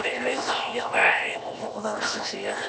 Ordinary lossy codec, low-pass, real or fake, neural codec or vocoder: none; none; fake; codec, 16 kHz, 0.7 kbps, FocalCodec